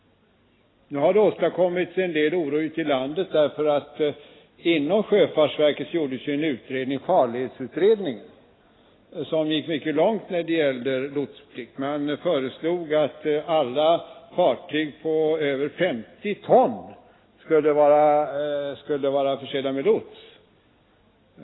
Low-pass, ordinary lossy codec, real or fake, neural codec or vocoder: 7.2 kHz; AAC, 16 kbps; real; none